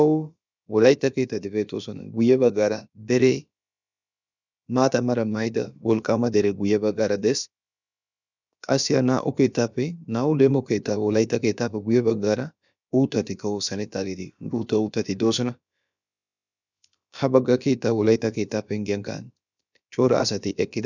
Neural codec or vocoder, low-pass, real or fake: codec, 16 kHz, about 1 kbps, DyCAST, with the encoder's durations; 7.2 kHz; fake